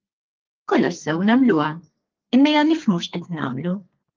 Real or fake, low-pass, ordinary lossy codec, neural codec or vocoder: fake; 7.2 kHz; Opus, 32 kbps; codec, 32 kHz, 1.9 kbps, SNAC